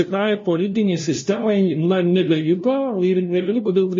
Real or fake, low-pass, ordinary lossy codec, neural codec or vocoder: fake; 7.2 kHz; MP3, 32 kbps; codec, 16 kHz, 0.5 kbps, FunCodec, trained on LibriTTS, 25 frames a second